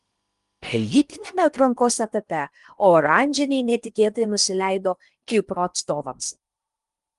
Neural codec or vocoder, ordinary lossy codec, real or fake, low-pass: codec, 16 kHz in and 24 kHz out, 0.8 kbps, FocalCodec, streaming, 65536 codes; Opus, 32 kbps; fake; 10.8 kHz